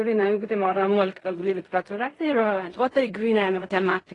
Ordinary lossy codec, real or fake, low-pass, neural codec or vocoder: AAC, 32 kbps; fake; 10.8 kHz; codec, 16 kHz in and 24 kHz out, 0.4 kbps, LongCat-Audio-Codec, fine tuned four codebook decoder